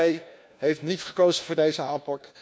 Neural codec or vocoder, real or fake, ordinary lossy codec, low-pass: codec, 16 kHz, 1 kbps, FunCodec, trained on LibriTTS, 50 frames a second; fake; none; none